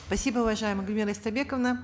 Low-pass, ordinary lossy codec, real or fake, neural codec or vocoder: none; none; real; none